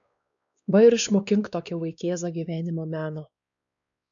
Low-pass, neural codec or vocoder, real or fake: 7.2 kHz; codec, 16 kHz, 2 kbps, X-Codec, WavLM features, trained on Multilingual LibriSpeech; fake